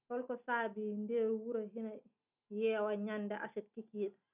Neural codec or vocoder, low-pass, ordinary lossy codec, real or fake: none; 3.6 kHz; none; real